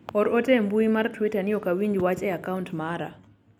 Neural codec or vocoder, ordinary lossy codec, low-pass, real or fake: none; none; 19.8 kHz; real